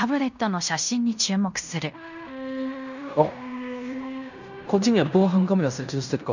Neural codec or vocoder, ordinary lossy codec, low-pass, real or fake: codec, 16 kHz in and 24 kHz out, 0.9 kbps, LongCat-Audio-Codec, fine tuned four codebook decoder; none; 7.2 kHz; fake